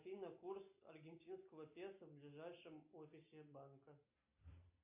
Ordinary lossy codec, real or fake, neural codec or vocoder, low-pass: MP3, 32 kbps; real; none; 3.6 kHz